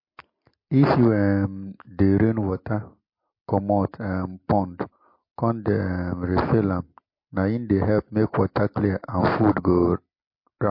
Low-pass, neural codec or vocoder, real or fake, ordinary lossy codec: 5.4 kHz; none; real; MP3, 32 kbps